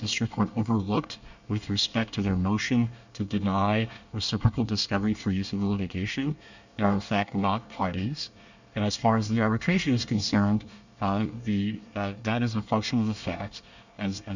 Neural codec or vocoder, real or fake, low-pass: codec, 24 kHz, 1 kbps, SNAC; fake; 7.2 kHz